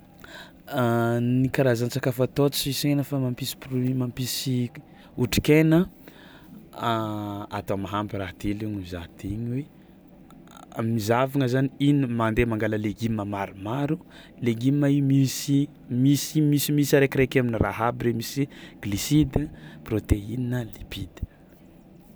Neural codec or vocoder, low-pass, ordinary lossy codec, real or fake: none; none; none; real